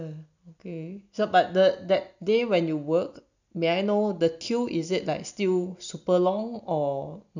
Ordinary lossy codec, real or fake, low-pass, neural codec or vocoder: none; real; 7.2 kHz; none